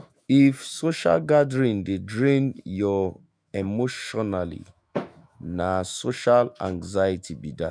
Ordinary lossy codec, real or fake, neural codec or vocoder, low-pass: none; fake; autoencoder, 48 kHz, 128 numbers a frame, DAC-VAE, trained on Japanese speech; 9.9 kHz